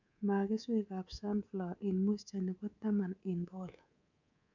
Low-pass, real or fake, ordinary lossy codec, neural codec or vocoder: 7.2 kHz; fake; none; codec, 24 kHz, 3.1 kbps, DualCodec